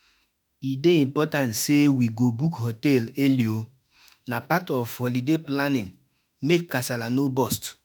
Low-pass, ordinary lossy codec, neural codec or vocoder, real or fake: none; none; autoencoder, 48 kHz, 32 numbers a frame, DAC-VAE, trained on Japanese speech; fake